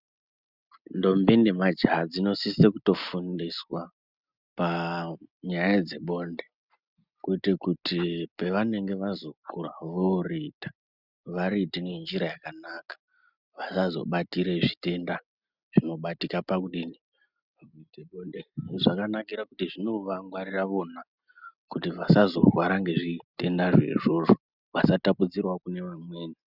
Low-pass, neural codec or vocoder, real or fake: 5.4 kHz; none; real